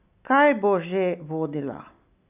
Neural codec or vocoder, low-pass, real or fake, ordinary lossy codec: none; 3.6 kHz; real; none